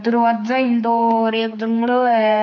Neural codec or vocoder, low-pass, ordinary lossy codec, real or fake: codec, 16 kHz, 4 kbps, X-Codec, HuBERT features, trained on general audio; 7.2 kHz; MP3, 48 kbps; fake